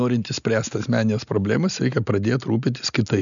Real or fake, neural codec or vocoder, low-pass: real; none; 7.2 kHz